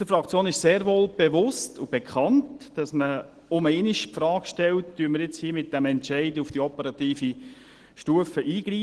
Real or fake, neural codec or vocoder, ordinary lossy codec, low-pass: real; none; Opus, 16 kbps; 10.8 kHz